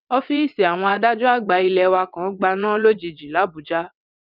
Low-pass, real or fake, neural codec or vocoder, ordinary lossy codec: 5.4 kHz; fake; vocoder, 22.05 kHz, 80 mel bands, WaveNeXt; none